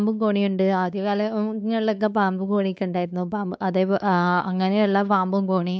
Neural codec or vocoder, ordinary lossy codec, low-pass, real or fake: codec, 16 kHz, 4 kbps, X-Codec, WavLM features, trained on Multilingual LibriSpeech; none; none; fake